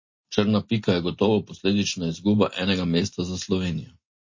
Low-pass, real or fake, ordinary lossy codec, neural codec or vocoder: 7.2 kHz; real; MP3, 32 kbps; none